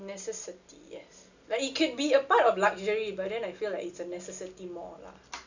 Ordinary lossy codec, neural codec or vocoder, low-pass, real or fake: none; none; 7.2 kHz; real